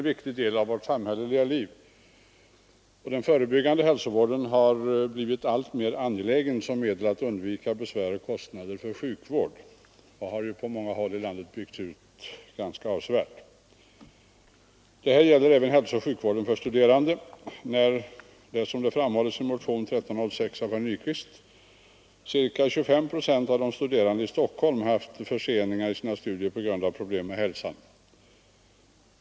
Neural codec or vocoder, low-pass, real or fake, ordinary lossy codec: none; none; real; none